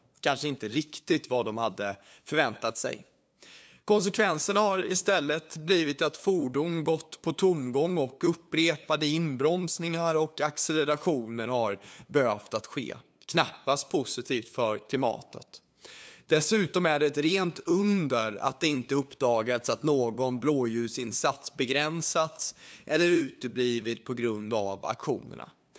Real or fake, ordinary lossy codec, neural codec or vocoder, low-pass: fake; none; codec, 16 kHz, 2 kbps, FunCodec, trained on LibriTTS, 25 frames a second; none